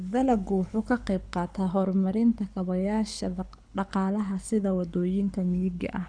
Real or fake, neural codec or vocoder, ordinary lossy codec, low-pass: fake; codec, 24 kHz, 6 kbps, HILCodec; none; 9.9 kHz